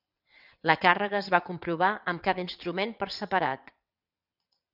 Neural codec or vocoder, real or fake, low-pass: vocoder, 22.05 kHz, 80 mel bands, WaveNeXt; fake; 5.4 kHz